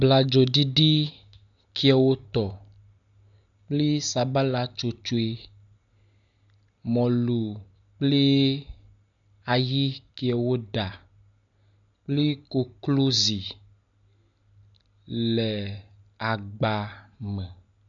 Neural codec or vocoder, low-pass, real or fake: none; 7.2 kHz; real